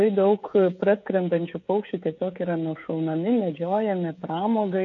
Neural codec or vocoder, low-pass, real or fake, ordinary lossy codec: codec, 16 kHz, 16 kbps, FreqCodec, smaller model; 7.2 kHz; fake; AAC, 48 kbps